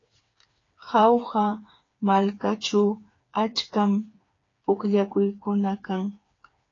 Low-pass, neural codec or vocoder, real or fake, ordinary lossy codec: 7.2 kHz; codec, 16 kHz, 4 kbps, FreqCodec, smaller model; fake; AAC, 32 kbps